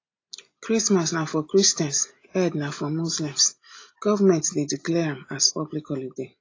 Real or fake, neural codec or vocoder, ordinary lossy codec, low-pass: real; none; AAC, 32 kbps; 7.2 kHz